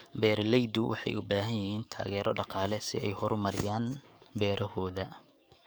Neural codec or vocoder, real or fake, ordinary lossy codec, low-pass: codec, 44.1 kHz, 7.8 kbps, DAC; fake; none; none